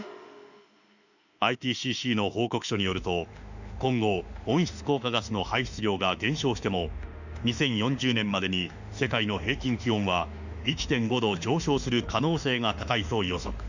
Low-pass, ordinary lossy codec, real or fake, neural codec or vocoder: 7.2 kHz; none; fake; autoencoder, 48 kHz, 32 numbers a frame, DAC-VAE, trained on Japanese speech